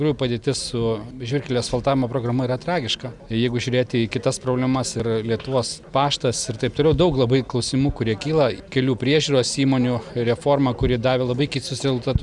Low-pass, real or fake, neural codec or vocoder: 10.8 kHz; real; none